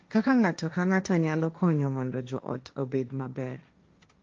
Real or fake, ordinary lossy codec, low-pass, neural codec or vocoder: fake; Opus, 24 kbps; 7.2 kHz; codec, 16 kHz, 1.1 kbps, Voila-Tokenizer